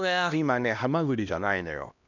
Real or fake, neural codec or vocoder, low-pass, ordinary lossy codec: fake; codec, 16 kHz, 1 kbps, X-Codec, HuBERT features, trained on LibriSpeech; 7.2 kHz; none